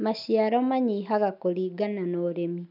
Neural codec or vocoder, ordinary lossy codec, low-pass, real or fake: none; none; 5.4 kHz; real